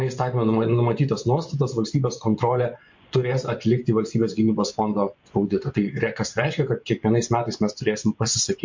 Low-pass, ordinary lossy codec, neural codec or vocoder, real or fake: 7.2 kHz; MP3, 48 kbps; vocoder, 24 kHz, 100 mel bands, Vocos; fake